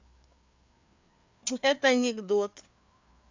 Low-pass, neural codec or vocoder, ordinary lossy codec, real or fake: 7.2 kHz; codec, 16 kHz, 4 kbps, FreqCodec, larger model; MP3, 64 kbps; fake